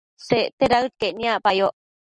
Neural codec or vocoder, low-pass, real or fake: none; 9.9 kHz; real